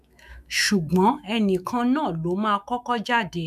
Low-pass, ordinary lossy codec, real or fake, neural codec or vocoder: 14.4 kHz; none; fake; autoencoder, 48 kHz, 128 numbers a frame, DAC-VAE, trained on Japanese speech